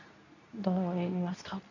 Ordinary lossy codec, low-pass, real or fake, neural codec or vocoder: none; 7.2 kHz; fake; codec, 24 kHz, 0.9 kbps, WavTokenizer, medium speech release version 2